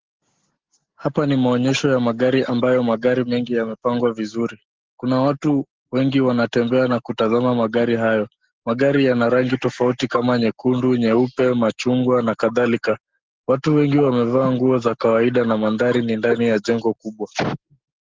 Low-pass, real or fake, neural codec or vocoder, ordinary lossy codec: 7.2 kHz; real; none; Opus, 16 kbps